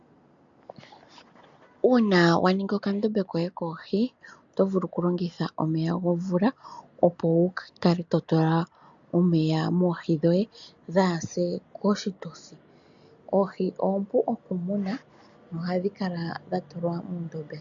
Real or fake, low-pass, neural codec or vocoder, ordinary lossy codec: real; 7.2 kHz; none; MP3, 64 kbps